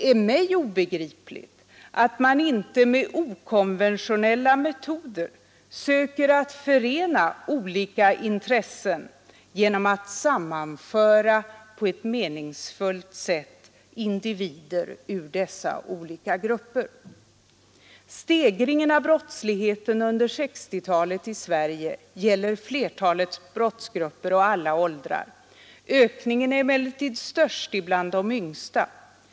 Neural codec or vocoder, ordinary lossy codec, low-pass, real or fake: none; none; none; real